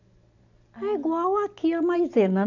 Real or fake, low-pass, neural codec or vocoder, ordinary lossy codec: real; 7.2 kHz; none; AAC, 48 kbps